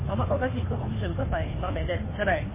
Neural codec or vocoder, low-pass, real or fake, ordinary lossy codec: codec, 16 kHz, 2 kbps, FunCodec, trained on Chinese and English, 25 frames a second; 3.6 kHz; fake; MP3, 16 kbps